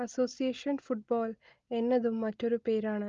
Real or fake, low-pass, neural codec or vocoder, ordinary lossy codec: real; 7.2 kHz; none; Opus, 32 kbps